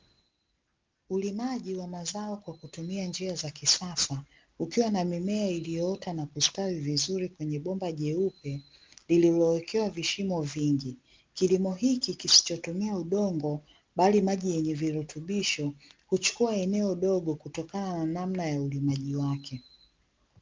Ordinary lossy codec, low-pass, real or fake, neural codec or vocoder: Opus, 32 kbps; 7.2 kHz; real; none